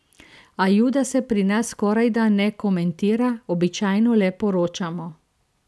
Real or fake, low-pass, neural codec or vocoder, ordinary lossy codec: real; none; none; none